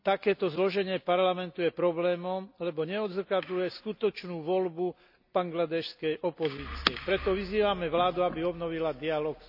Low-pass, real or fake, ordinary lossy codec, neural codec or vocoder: 5.4 kHz; real; none; none